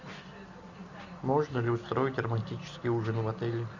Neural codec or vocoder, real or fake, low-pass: none; real; 7.2 kHz